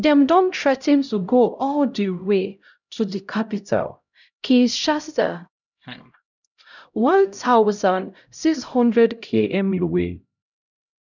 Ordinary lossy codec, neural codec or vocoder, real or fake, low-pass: none; codec, 16 kHz, 0.5 kbps, X-Codec, HuBERT features, trained on LibriSpeech; fake; 7.2 kHz